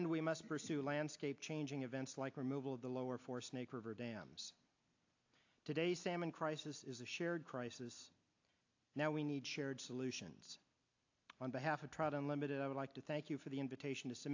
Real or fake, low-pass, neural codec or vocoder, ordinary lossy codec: real; 7.2 kHz; none; AAC, 48 kbps